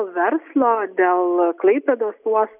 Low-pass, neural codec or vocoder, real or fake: 3.6 kHz; none; real